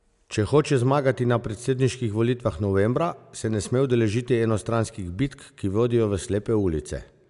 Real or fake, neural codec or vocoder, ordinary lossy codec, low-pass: real; none; AAC, 96 kbps; 10.8 kHz